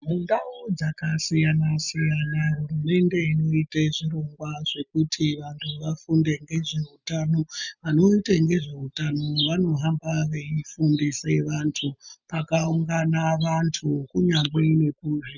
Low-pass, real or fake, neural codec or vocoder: 7.2 kHz; real; none